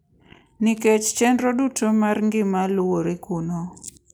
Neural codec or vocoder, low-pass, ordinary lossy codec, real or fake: none; none; none; real